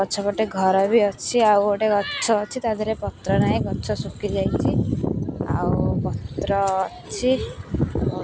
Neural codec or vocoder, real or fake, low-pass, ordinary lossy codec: none; real; none; none